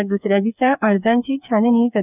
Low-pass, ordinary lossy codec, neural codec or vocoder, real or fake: 3.6 kHz; none; codec, 16 kHz, 4 kbps, FreqCodec, smaller model; fake